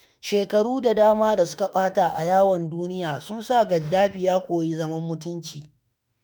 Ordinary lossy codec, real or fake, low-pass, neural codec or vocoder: none; fake; none; autoencoder, 48 kHz, 32 numbers a frame, DAC-VAE, trained on Japanese speech